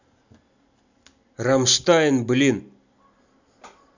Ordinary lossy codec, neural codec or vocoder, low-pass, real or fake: none; none; 7.2 kHz; real